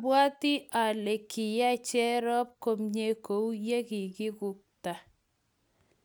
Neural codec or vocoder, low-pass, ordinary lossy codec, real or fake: none; none; none; real